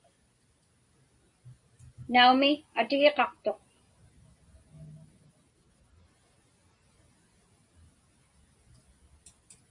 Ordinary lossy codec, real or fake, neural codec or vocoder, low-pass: MP3, 48 kbps; real; none; 10.8 kHz